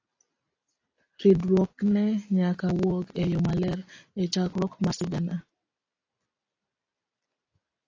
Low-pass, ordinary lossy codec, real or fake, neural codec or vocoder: 7.2 kHz; AAC, 32 kbps; real; none